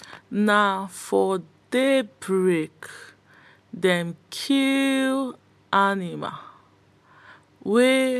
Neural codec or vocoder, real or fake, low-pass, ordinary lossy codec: none; real; 14.4 kHz; MP3, 96 kbps